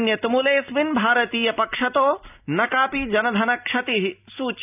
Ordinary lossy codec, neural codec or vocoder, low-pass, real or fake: none; none; 3.6 kHz; real